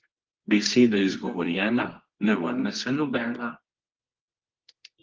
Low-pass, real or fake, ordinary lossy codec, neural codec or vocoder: 7.2 kHz; fake; Opus, 16 kbps; codec, 24 kHz, 0.9 kbps, WavTokenizer, medium music audio release